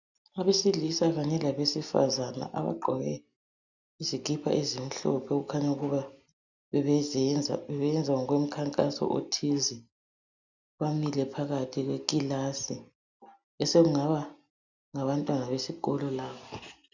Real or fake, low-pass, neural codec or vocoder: real; 7.2 kHz; none